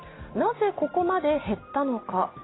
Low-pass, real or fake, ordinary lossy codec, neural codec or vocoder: 7.2 kHz; real; AAC, 16 kbps; none